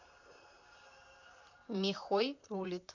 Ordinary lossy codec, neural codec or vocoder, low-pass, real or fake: none; vocoder, 44.1 kHz, 128 mel bands, Pupu-Vocoder; 7.2 kHz; fake